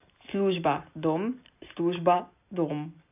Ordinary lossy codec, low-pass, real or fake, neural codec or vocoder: AAC, 32 kbps; 3.6 kHz; real; none